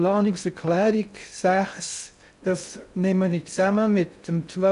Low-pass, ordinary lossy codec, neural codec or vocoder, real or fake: 10.8 kHz; none; codec, 16 kHz in and 24 kHz out, 0.6 kbps, FocalCodec, streaming, 4096 codes; fake